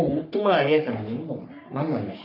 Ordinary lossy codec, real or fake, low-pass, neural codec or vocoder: none; fake; 5.4 kHz; codec, 44.1 kHz, 3.4 kbps, Pupu-Codec